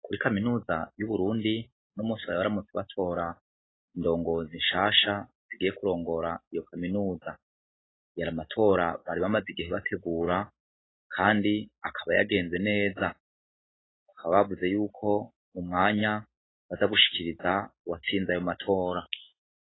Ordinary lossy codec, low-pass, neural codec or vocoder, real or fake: AAC, 16 kbps; 7.2 kHz; none; real